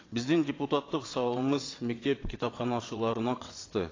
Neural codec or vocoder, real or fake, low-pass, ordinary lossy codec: vocoder, 22.05 kHz, 80 mel bands, WaveNeXt; fake; 7.2 kHz; AAC, 32 kbps